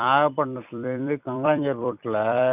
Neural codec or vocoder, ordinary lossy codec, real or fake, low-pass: vocoder, 44.1 kHz, 128 mel bands every 256 samples, BigVGAN v2; none; fake; 3.6 kHz